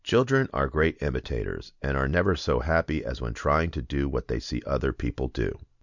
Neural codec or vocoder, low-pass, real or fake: none; 7.2 kHz; real